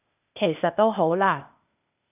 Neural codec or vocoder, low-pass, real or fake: codec, 16 kHz, 0.8 kbps, ZipCodec; 3.6 kHz; fake